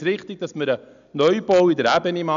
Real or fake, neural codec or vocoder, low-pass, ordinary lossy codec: real; none; 7.2 kHz; none